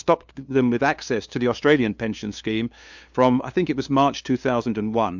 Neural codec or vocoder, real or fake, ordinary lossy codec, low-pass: codec, 16 kHz, 2 kbps, FunCodec, trained on LibriTTS, 25 frames a second; fake; MP3, 64 kbps; 7.2 kHz